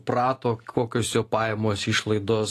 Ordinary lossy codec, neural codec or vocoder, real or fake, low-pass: AAC, 48 kbps; none; real; 14.4 kHz